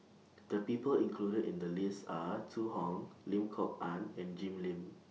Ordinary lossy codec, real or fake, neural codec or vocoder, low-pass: none; real; none; none